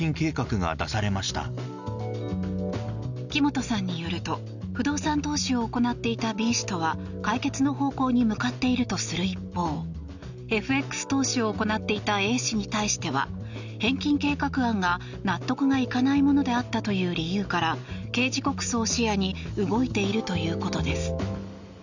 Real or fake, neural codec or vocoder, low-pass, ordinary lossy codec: real; none; 7.2 kHz; none